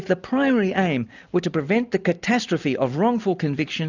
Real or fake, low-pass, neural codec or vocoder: real; 7.2 kHz; none